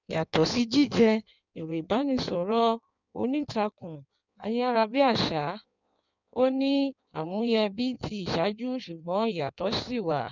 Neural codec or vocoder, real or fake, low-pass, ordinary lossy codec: codec, 16 kHz in and 24 kHz out, 1.1 kbps, FireRedTTS-2 codec; fake; 7.2 kHz; none